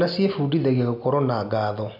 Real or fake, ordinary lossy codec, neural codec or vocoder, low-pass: real; none; none; 5.4 kHz